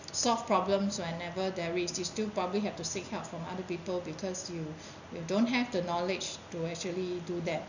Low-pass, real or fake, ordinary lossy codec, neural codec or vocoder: 7.2 kHz; real; none; none